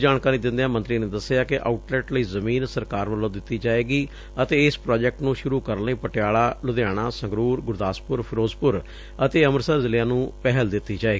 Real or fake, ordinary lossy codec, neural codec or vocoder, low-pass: real; none; none; none